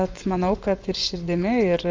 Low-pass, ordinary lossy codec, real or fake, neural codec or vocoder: 7.2 kHz; Opus, 32 kbps; fake; vocoder, 24 kHz, 100 mel bands, Vocos